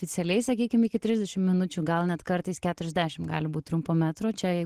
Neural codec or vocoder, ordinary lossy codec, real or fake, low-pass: none; Opus, 16 kbps; real; 14.4 kHz